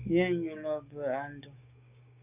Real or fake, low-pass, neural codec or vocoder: fake; 3.6 kHz; codec, 16 kHz, 6 kbps, DAC